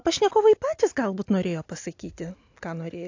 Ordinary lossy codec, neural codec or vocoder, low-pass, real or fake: AAC, 48 kbps; none; 7.2 kHz; real